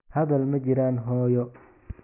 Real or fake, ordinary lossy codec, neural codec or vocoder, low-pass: real; none; none; 3.6 kHz